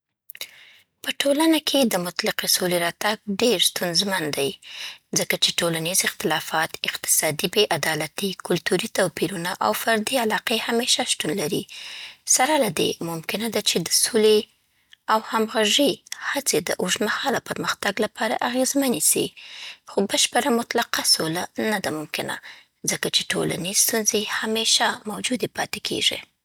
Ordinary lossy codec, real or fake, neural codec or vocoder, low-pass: none; real; none; none